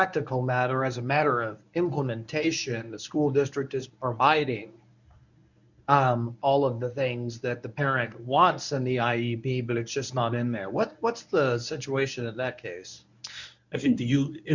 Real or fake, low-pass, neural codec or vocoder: fake; 7.2 kHz; codec, 24 kHz, 0.9 kbps, WavTokenizer, medium speech release version 1